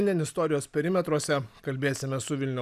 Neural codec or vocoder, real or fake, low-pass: none; real; 14.4 kHz